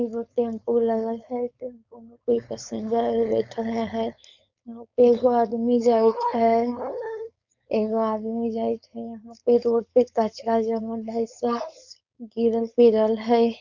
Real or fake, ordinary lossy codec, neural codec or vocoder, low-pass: fake; none; codec, 16 kHz, 4.8 kbps, FACodec; 7.2 kHz